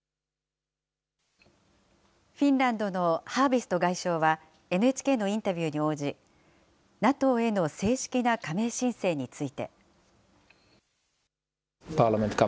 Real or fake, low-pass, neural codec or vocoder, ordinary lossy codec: real; none; none; none